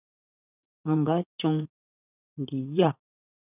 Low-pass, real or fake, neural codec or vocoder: 3.6 kHz; fake; vocoder, 44.1 kHz, 80 mel bands, Vocos